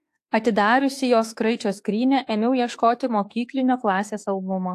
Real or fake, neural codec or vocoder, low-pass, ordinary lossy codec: fake; autoencoder, 48 kHz, 32 numbers a frame, DAC-VAE, trained on Japanese speech; 14.4 kHz; AAC, 64 kbps